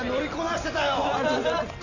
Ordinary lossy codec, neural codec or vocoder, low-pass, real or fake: none; none; 7.2 kHz; real